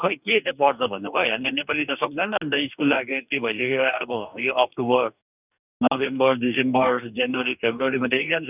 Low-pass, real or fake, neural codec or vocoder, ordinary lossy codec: 3.6 kHz; fake; codec, 44.1 kHz, 2.6 kbps, DAC; none